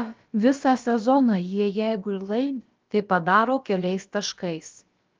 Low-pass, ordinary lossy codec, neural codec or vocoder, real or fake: 7.2 kHz; Opus, 24 kbps; codec, 16 kHz, about 1 kbps, DyCAST, with the encoder's durations; fake